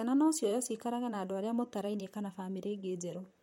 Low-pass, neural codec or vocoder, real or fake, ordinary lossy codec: 19.8 kHz; vocoder, 44.1 kHz, 128 mel bands, Pupu-Vocoder; fake; MP3, 64 kbps